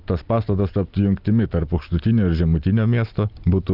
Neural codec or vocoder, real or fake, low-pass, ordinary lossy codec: none; real; 5.4 kHz; Opus, 24 kbps